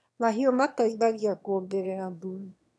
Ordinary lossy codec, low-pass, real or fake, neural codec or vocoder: none; none; fake; autoencoder, 22.05 kHz, a latent of 192 numbers a frame, VITS, trained on one speaker